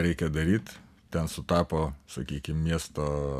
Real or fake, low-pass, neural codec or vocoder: real; 14.4 kHz; none